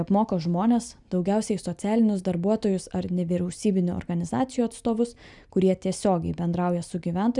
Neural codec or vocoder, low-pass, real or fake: none; 10.8 kHz; real